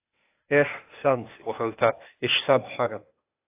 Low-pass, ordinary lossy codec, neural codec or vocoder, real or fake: 3.6 kHz; AAC, 24 kbps; codec, 16 kHz, 0.8 kbps, ZipCodec; fake